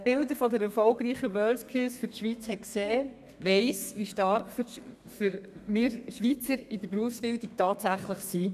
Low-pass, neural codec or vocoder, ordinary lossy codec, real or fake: 14.4 kHz; codec, 32 kHz, 1.9 kbps, SNAC; none; fake